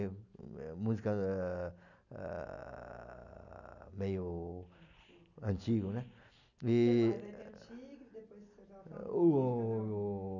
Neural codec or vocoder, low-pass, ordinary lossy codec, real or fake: none; 7.2 kHz; none; real